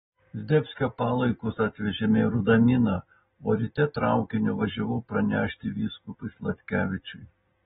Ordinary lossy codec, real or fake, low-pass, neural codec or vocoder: AAC, 16 kbps; real; 19.8 kHz; none